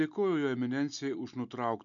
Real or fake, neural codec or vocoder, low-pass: real; none; 7.2 kHz